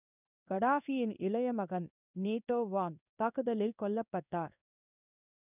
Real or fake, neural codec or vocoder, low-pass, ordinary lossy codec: fake; codec, 16 kHz in and 24 kHz out, 1 kbps, XY-Tokenizer; 3.6 kHz; none